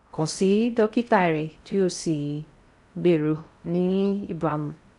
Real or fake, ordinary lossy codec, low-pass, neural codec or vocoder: fake; none; 10.8 kHz; codec, 16 kHz in and 24 kHz out, 0.6 kbps, FocalCodec, streaming, 4096 codes